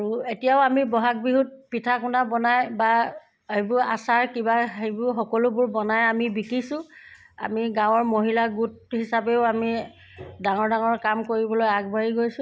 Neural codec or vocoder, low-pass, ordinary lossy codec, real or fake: none; none; none; real